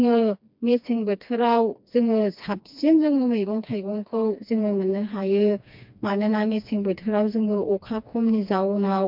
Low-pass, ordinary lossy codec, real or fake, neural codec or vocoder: 5.4 kHz; MP3, 48 kbps; fake; codec, 16 kHz, 2 kbps, FreqCodec, smaller model